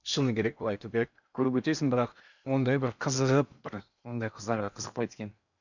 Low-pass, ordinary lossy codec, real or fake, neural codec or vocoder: 7.2 kHz; none; fake; codec, 16 kHz in and 24 kHz out, 0.8 kbps, FocalCodec, streaming, 65536 codes